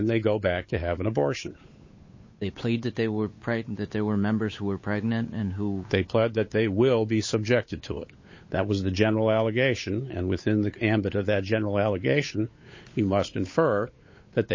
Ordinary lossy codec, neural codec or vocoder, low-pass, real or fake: MP3, 32 kbps; codec, 16 kHz, 8 kbps, FunCodec, trained on Chinese and English, 25 frames a second; 7.2 kHz; fake